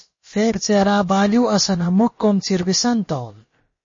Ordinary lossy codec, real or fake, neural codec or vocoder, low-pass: MP3, 32 kbps; fake; codec, 16 kHz, about 1 kbps, DyCAST, with the encoder's durations; 7.2 kHz